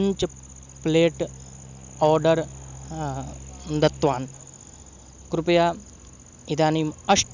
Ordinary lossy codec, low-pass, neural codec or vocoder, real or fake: none; 7.2 kHz; none; real